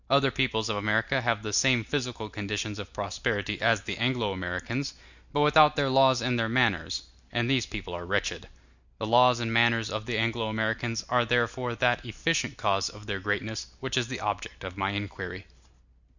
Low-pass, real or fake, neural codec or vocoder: 7.2 kHz; real; none